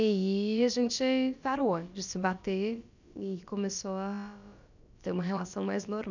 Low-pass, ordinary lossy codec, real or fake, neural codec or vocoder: 7.2 kHz; none; fake; codec, 16 kHz, about 1 kbps, DyCAST, with the encoder's durations